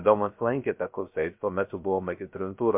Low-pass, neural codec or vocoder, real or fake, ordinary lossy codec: 3.6 kHz; codec, 16 kHz, 0.3 kbps, FocalCodec; fake; MP3, 32 kbps